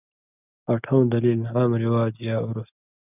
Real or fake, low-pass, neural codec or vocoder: real; 3.6 kHz; none